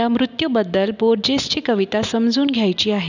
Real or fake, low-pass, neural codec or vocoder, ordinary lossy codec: real; 7.2 kHz; none; none